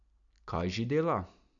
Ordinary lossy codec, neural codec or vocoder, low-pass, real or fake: none; none; 7.2 kHz; real